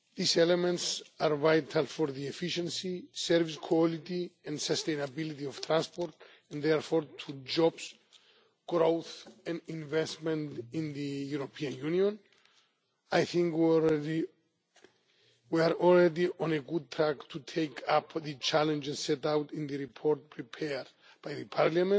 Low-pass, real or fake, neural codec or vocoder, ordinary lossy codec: none; real; none; none